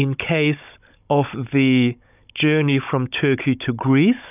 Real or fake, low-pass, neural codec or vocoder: fake; 3.6 kHz; codec, 16 kHz, 16 kbps, FunCodec, trained on Chinese and English, 50 frames a second